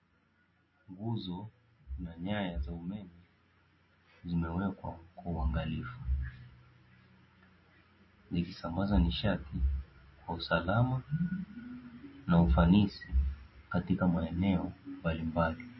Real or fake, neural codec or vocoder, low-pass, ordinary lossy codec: real; none; 7.2 kHz; MP3, 24 kbps